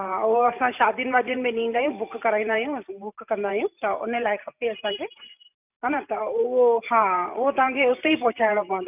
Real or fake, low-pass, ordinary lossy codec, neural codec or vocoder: fake; 3.6 kHz; none; vocoder, 44.1 kHz, 128 mel bands every 512 samples, BigVGAN v2